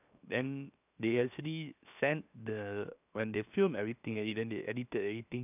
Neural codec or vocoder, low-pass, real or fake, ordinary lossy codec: codec, 16 kHz, 0.7 kbps, FocalCodec; 3.6 kHz; fake; none